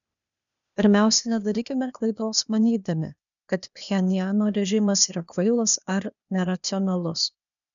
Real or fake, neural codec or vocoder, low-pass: fake; codec, 16 kHz, 0.8 kbps, ZipCodec; 7.2 kHz